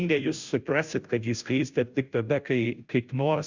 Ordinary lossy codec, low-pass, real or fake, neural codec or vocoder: Opus, 64 kbps; 7.2 kHz; fake; codec, 16 kHz, 0.5 kbps, FunCodec, trained on Chinese and English, 25 frames a second